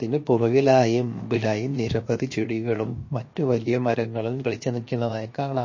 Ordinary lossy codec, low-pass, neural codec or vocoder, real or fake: MP3, 32 kbps; 7.2 kHz; codec, 16 kHz, 0.7 kbps, FocalCodec; fake